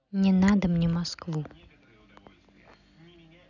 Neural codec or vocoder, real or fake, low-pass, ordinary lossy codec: none; real; 7.2 kHz; none